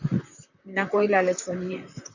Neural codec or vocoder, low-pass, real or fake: vocoder, 44.1 kHz, 128 mel bands, Pupu-Vocoder; 7.2 kHz; fake